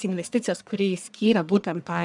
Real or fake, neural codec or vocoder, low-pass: fake; codec, 44.1 kHz, 1.7 kbps, Pupu-Codec; 10.8 kHz